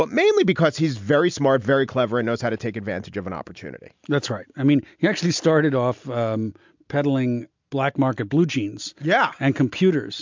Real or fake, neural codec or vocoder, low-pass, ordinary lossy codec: real; none; 7.2 kHz; MP3, 64 kbps